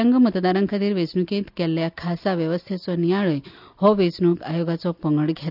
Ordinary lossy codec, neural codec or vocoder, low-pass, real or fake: none; none; 5.4 kHz; real